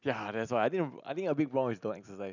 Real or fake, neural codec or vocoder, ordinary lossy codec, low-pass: real; none; none; 7.2 kHz